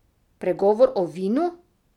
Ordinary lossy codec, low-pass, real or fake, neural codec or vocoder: none; 19.8 kHz; real; none